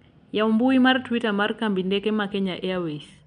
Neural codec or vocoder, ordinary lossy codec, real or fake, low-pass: none; none; real; 9.9 kHz